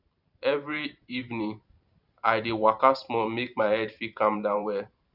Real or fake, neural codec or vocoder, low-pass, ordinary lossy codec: fake; vocoder, 44.1 kHz, 128 mel bands every 512 samples, BigVGAN v2; 5.4 kHz; none